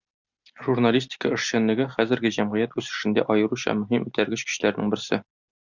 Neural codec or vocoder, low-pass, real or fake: none; 7.2 kHz; real